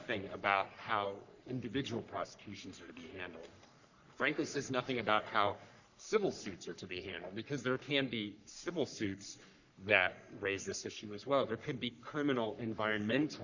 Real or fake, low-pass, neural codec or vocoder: fake; 7.2 kHz; codec, 44.1 kHz, 3.4 kbps, Pupu-Codec